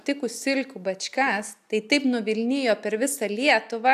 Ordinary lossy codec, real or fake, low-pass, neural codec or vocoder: AAC, 96 kbps; real; 14.4 kHz; none